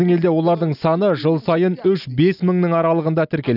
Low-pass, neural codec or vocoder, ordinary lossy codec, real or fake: 5.4 kHz; none; none; real